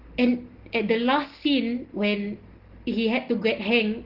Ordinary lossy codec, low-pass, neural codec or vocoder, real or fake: Opus, 16 kbps; 5.4 kHz; none; real